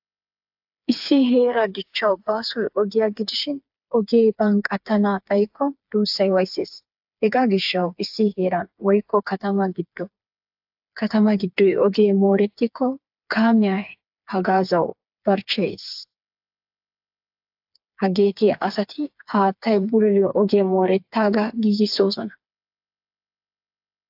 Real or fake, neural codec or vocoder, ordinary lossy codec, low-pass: fake; codec, 16 kHz, 4 kbps, FreqCodec, smaller model; AAC, 48 kbps; 5.4 kHz